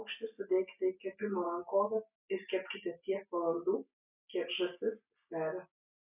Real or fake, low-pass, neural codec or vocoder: real; 3.6 kHz; none